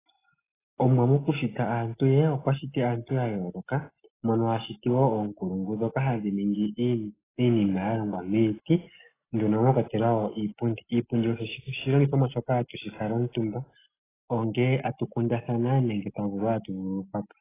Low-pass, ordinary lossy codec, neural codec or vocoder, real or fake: 3.6 kHz; AAC, 16 kbps; none; real